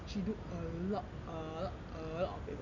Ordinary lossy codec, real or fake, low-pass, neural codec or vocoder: none; real; 7.2 kHz; none